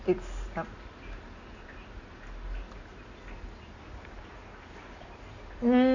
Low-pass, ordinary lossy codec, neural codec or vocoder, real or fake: 7.2 kHz; AAC, 48 kbps; none; real